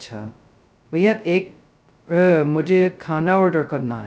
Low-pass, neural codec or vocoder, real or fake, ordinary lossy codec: none; codec, 16 kHz, 0.2 kbps, FocalCodec; fake; none